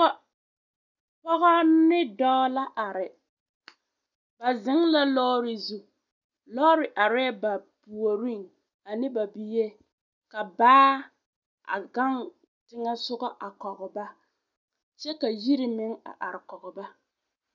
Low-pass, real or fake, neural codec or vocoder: 7.2 kHz; real; none